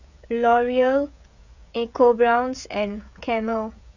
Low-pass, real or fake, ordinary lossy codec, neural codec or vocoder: 7.2 kHz; fake; none; codec, 16 kHz, 16 kbps, FreqCodec, smaller model